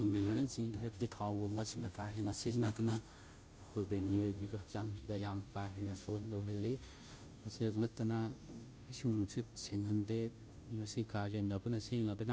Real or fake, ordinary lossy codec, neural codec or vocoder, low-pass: fake; none; codec, 16 kHz, 0.5 kbps, FunCodec, trained on Chinese and English, 25 frames a second; none